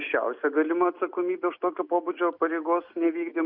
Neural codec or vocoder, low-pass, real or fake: none; 5.4 kHz; real